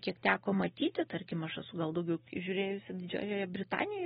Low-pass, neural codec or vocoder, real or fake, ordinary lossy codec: 19.8 kHz; none; real; AAC, 16 kbps